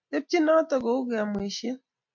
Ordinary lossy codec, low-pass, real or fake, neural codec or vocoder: MP3, 48 kbps; 7.2 kHz; real; none